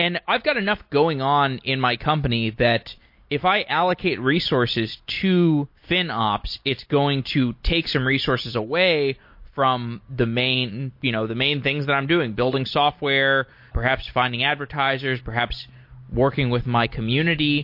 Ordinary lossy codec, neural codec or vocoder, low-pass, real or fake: MP3, 32 kbps; none; 5.4 kHz; real